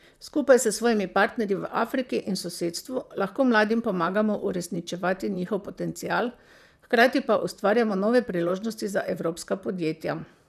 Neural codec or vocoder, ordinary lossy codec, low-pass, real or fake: vocoder, 44.1 kHz, 128 mel bands, Pupu-Vocoder; none; 14.4 kHz; fake